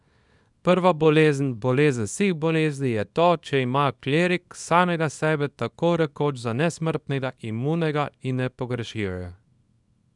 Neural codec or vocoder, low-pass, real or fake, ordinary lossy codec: codec, 24 kHz, 0.9 kbps, WavTokenizer, small release; 10.8 kHz; fake; none